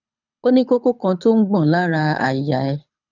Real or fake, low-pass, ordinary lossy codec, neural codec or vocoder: fake; 7.2 kHz; none; codec, 24 kHz, 6 kbps, HILCodec